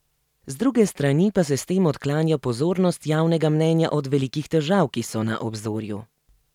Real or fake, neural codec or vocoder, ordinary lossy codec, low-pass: real; none; none; 19.8 kHz